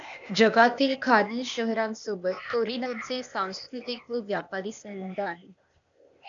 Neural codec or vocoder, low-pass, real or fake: codec, 16 kHz, 0.8 kbps, ZipCodec; 7.2 kHz; fake